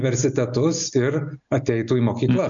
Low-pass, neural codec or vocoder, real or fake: 7.2 kHz; none; real